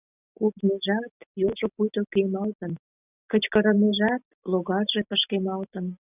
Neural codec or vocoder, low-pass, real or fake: none; 3.6 kHz; real